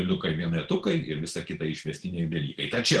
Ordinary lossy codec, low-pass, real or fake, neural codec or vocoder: Opus, 16 kbps; 10.8 kHz; real; none